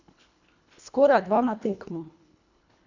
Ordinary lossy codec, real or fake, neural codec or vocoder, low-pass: none; fake; codec, 24 kHz, 1.5 kbps, HILCodec; 7.2 kHz